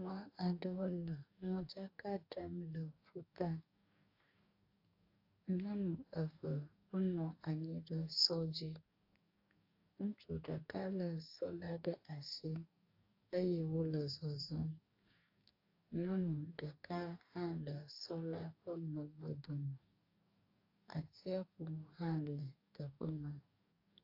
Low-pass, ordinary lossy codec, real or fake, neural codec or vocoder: 5.4 kHz; AAC, 32 kbps; fake; codec, 44.1 kHz, 2.6 kbps, DAC